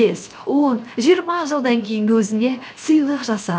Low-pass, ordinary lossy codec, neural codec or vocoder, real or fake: none; none; codec, 16 kHz, 0.7 kbps, FocalCodec; fake